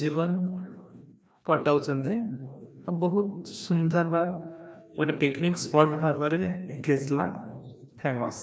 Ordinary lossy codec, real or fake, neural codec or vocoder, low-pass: none; fake; codec, 16 kHz, 1 kbps, FreqCodec, larger model; none